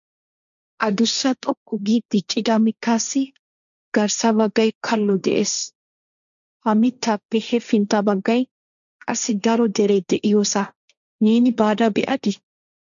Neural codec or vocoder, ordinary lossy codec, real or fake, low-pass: codec, 16 kHz, 1.1 kbps, Voila-Tokenizer; MP3, 96 kbps; fake; 7.2 kHz